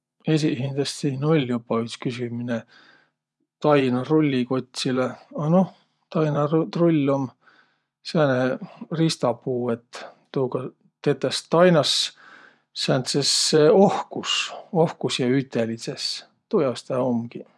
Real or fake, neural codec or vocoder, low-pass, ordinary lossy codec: fake; vocoder, 24 kHz, 100 mel bands, Vocos; none; none